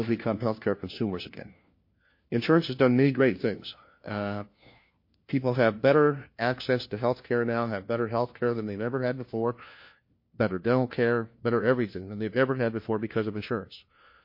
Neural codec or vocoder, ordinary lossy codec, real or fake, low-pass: codec, 16 kHz, 1 kbps, FunCodec, trained on LibriTTS, 50 frames a second; MP3, 32 kbps; fake; 5.4 kHz